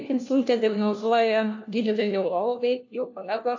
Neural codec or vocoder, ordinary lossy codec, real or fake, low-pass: codec, 16 kHz, 1 kbps, FunCodec, trained on LibriTTS, 50 frames a second; AAC, 48 kbps; fake; 7.2 kHz